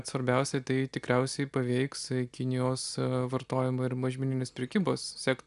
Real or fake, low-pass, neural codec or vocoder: real; 10.8 kHz; none